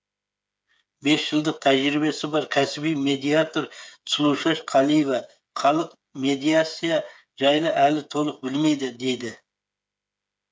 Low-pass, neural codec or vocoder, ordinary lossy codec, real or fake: none; codec, 16 kHz, 8 kbps, FreqCodec, smaller model; none; fake